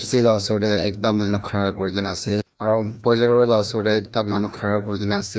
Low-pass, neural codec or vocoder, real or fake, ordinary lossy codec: none; codec, 16 kHz, 1 kbps, FreqCodec, larger model; fake; none